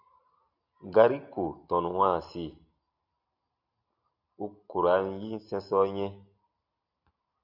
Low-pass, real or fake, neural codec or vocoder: 5.4 kHz; real; none